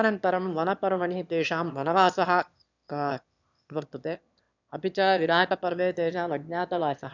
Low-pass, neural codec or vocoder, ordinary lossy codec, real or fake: 7.2 kHz; autoencoder, 22.05 kHz, a latent of 192 numbers a frame, VITS, trained on one speaker; none; fake